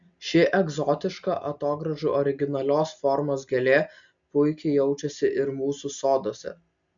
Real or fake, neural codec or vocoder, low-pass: real; none; 7.2 kHz